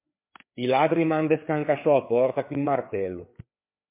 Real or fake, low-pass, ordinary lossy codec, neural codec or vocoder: fake; 3.6 kHz; MP3, 24 kbps; codec, 16 kHz, 4 kbps, FreqCodec, larger model